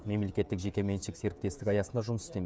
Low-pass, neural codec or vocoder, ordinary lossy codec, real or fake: none; codec, 16 kHz, 16 kbps, FreqCodec, smaller model; none; fake